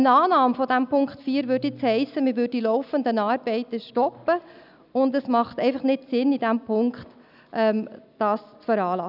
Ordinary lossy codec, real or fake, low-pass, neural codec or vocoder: none; real; 5.4 kHz; none